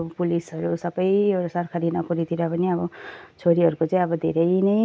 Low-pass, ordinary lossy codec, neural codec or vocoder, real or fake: none; none; none; real